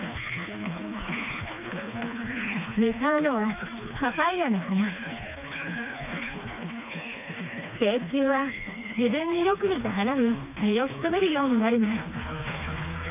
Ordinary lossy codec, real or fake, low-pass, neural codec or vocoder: none; fake; 3.6 kHz; codec, 16 kHz, 2 kbps, FreqCodec, smaller model